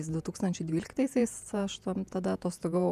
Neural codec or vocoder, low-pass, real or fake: vocoder, 48 kHz, 128 mel bands, Vocos; 14.4 kHz; fake